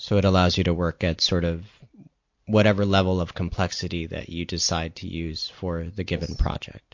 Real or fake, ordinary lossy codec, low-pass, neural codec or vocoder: real; MP3, 48 kbps; 7.2 kHz; none